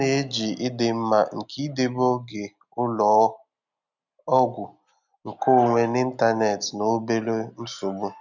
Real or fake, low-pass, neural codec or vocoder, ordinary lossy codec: real; 7.2 kHz; none; none